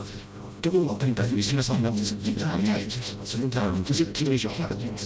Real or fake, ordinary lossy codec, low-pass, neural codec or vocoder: fake; none; none; codec, 16 kHz, 0.5 kbps, FreqCodec, smaller model